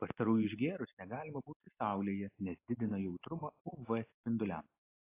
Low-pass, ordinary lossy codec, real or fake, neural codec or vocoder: 3.6 kHz; AAC, 24 kbps; real; none